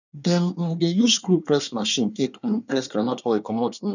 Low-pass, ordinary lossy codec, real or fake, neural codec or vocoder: 7.2 kHz; none; fake; codec, 24 kHz, 1 kbps, SNAC